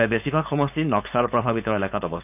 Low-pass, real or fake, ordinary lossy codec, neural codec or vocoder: 3.6 kHz; fake; none; codec, 16 kHz, 4.8 kbps, FACodec